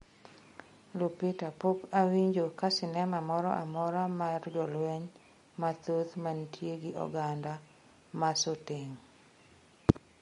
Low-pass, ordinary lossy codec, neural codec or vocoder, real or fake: 10.8 kHz; MP3, 48 kbps; none; real